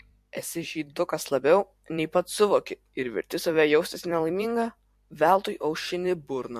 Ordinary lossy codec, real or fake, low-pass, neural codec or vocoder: MP3, 64 kbps; fake; 14.4 kHz; vocoder, 44.1 kHz, 128 mel bands every 256 samples, BigVGAN v2